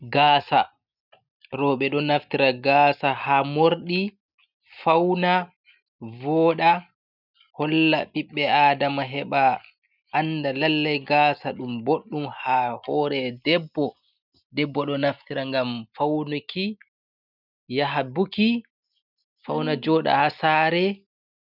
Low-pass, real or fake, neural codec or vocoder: 5.4 kHz; real; none